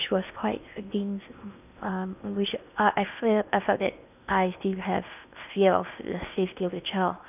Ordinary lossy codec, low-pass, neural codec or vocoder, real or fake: none; 3.6 kHz; codec, 16 kHz in and 24 kHz out, 0.8 kbps, FocalCodec, streaming, 65536 codes; fake